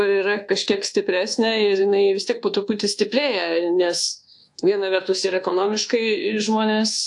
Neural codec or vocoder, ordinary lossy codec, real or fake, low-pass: codec, 24 kHz, 1.2 kbps, DualCodec; AAC, 64 kbps; fake; 10.8 kHz